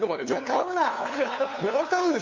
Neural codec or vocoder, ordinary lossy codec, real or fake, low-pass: codec, 16 kHz, 2 kbps, FunCodec, trained on LibriTTS, 25 frames a second; MP3, 48 kbps; fake; 7.2 kHz